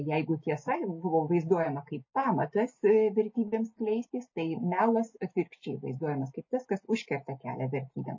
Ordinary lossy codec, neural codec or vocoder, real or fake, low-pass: MP3, 32 kbps; none; real; 7.2 kHz